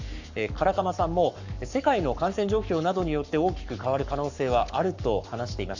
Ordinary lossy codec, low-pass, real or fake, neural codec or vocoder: none; 7.2 kHz; fake; codec, 44.1 kHz, 7.8 kbps, Pupu-Codec